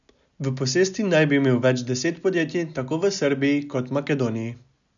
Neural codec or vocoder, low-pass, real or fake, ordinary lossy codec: none; 7.2 kHz; real; none